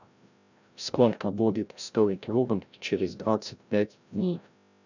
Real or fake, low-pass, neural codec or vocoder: fake; 7.2 kHz; codec, 16 kHz, 0.5 kbps, FreqCodec, larger model